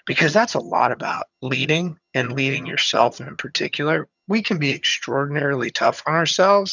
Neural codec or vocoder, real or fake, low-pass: vocoder, 22.05 kHz, 80 mel bands, HiFi-GAN; fake; 7.2 kHz